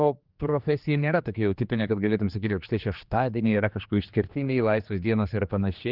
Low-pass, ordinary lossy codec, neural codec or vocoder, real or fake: 5.4 kHz; Opus, 32 kbps; codec, 16 kHz, 2 kbps, X-Codec, HuBERT features, trained on general audio; fake